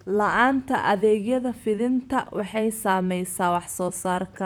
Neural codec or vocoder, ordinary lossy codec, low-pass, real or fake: vocoder, 44.1 kHz, 128 mel bands, Pupu-Vocoder; none; 19.8 kHz; fake